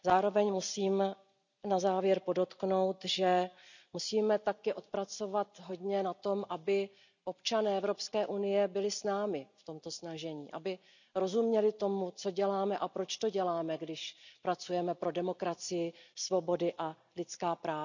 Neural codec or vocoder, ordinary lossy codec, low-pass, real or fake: none; none; 7.2 kHz; real